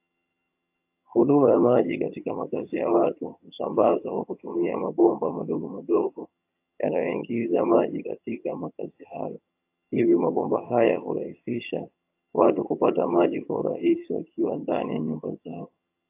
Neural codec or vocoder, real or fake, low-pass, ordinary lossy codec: vocoder, 22.05 kHz, 80 mel bands, HiFi-GAN; fake; 3.6 kHz; AAC, 32 kbps